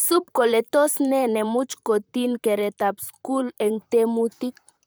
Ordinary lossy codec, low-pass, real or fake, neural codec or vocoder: none; none; fake; vocoder, 44.1 kHz, 128 mel bands, Pupu-Vocoder